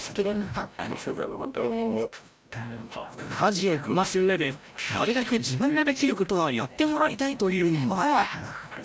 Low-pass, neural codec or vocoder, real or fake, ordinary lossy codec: none; codec, 16 kHz, 0.5 kbps, FreqCodec, larger model; fake; none